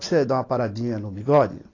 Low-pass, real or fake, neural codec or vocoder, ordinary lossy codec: 7.2 kHz; real; none; AAC, 32 kbps